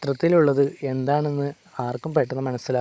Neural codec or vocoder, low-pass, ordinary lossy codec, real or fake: codec, 16 kHz, 16 kbps, FunCodec, trained on Chinese and English, 50 frames a second; none; none; fake